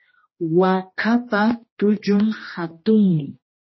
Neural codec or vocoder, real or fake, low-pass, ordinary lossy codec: codec, 16 kHz, 1 kbps, X-Codec, HuBERT features, trained on general audio; fake; 7.2 kHz; MP3, 24 kbps